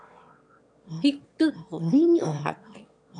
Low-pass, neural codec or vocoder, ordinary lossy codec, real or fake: 9.9 kHz; autoencoder, 22.05 kHz, a latent of 192 numbers a frame, VITS, trained on one speaker; MP3, 64 kbps; fake